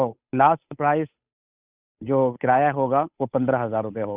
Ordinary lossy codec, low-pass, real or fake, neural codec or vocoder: Opus, 64 kbps; 3.6 kHz; fake; codec, 16 kHz, 8 kbps, FunCodec, trained on Chinese and English, 25 frames a second